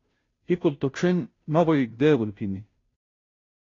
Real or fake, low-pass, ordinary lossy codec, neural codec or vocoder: fake; 7.2 kHz; AAC, 32 kbps; codec, 16 kHz, 0.5 kbps, FunCodec, trained on Chinese and English, 25 frames a second